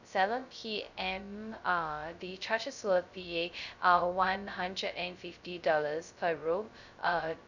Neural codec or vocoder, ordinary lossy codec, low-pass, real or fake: codec, 16 kHz, 0.2 kbps, FocalCodec; none; 7.2 kHz; fake